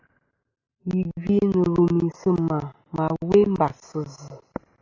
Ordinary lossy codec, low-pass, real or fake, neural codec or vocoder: AAC, 48 kbps; 7.2 kHz; real; none